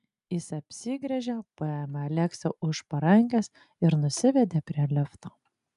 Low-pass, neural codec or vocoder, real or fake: 10.8 kHz; none; real